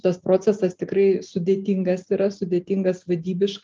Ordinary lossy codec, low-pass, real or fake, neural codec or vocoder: Opus, 24 kbps; 7.2 kHz; real; none